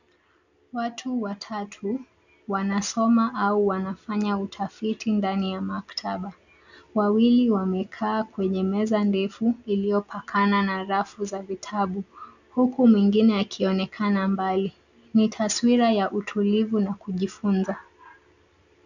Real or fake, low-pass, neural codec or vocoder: real; 7.2 kHz; none